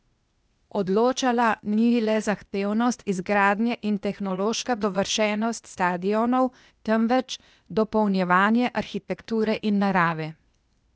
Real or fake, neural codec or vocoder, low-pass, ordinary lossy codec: fake; codec, 16 kHz, 0.8 kbps, ZipCodec; none; none